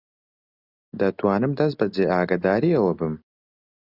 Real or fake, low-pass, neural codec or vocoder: real; 5.4 kHz; none